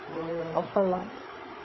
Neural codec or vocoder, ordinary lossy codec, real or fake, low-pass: codec, 16 kHz, 8 kbps, FreqCodec, larger model; MP3, 24 kbps; fake; 7.2 kHz